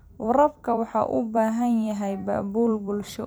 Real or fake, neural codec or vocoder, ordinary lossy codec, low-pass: fake; vocoder, 44.1 kHz, 128 mel bands every 256 samples, BigVGAN v2; none; none